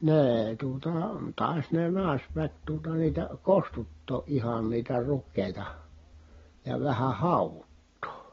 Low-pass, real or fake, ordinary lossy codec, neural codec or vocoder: 7.2 kHz; real; AAC, 24 kbps; none